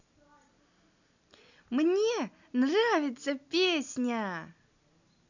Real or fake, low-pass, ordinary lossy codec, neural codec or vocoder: real; 7.2 kHz; none; none